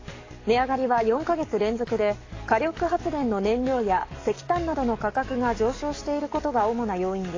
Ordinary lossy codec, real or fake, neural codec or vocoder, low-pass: AAC, 32 kbps; fake; codec, 44.1 kHz, 7.8 kbps, DAC; 7.2 kHz